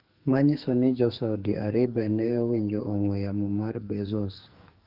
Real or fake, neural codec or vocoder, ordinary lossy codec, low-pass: fake; codec, 44.1 kHz, 2.6 kbps, SNAC; Opus, 24 kbps; 5.4 kHz